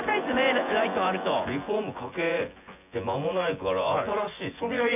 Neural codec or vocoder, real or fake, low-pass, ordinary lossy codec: vocoder, 24 kHz, 100 mel bands, Vocos; fake; 3.6 kHz; none